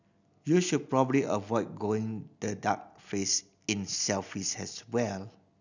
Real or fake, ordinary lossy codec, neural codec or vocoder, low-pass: real; none; none; 7.2 kHz